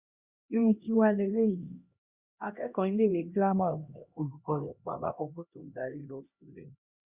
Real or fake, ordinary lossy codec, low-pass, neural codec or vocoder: fake; Opus, 64 kbps; 3.6 kHz; codec, 16 kHz, 1 kbps, X-Codec, HuBERT features, trained on LibriSpeech